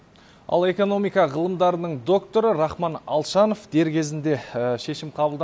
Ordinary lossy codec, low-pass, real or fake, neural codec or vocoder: none; none; real; none